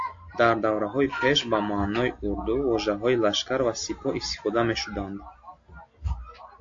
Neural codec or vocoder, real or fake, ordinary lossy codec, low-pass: none; real; AAC, 48 kbps; 7.2 kHz